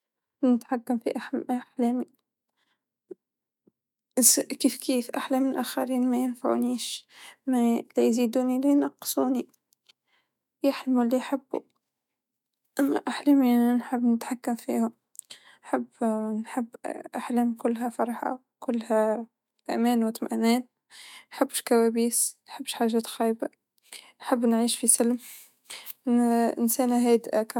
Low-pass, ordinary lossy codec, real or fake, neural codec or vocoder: 19.8 kHz; none; fake; autoencoder, 48 kHz, 128 numbers a frame, DAC-VAE, trained on Japanese speech